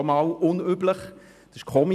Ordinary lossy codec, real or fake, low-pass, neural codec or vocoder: none; real; 14.4 kHz; none